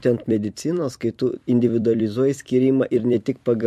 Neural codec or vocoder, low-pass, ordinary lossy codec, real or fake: vocoder, 44.1 kHz, 128 mel bands every 256 samples, BigVGAN v2; 14.4 kHz; MP3, 64 kbps; fake